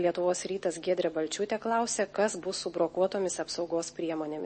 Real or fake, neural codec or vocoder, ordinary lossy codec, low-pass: real; none; MP3, 32 kbps; 10.8 kHz